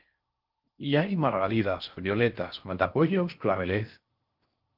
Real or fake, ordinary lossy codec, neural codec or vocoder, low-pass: fake; Opus, 32 kbps; codec, 16 kHz in and 24 kHz out, 0.6 kbps, FocalCodec, streaming, 4096 codes; 5.4 kHz